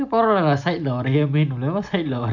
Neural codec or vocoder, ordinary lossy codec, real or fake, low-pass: none; none; real; 7.2 kHz